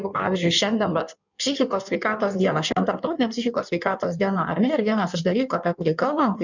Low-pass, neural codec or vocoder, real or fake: 7.2 kHz; codec, 16 kHz in and 24 kHz out, 1.1 kbps, FireRedTTS-2 codec; fake